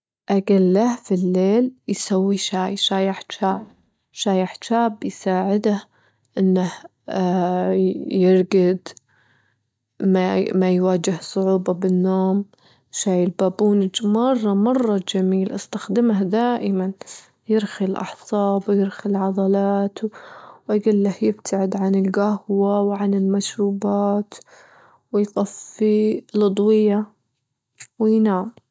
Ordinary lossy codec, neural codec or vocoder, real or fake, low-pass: none; none; real; none